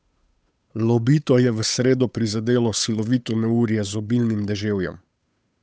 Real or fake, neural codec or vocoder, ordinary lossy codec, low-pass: fake; codec, 16 kHz, 8 kbps, FunCodec, trained on Chinese and English, 25 frames a second; none; none